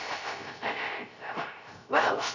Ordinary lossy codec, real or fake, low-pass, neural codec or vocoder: none; fake; 7.2 kHz; codec, 16 kHz, 0.3 kbps, FocalCodec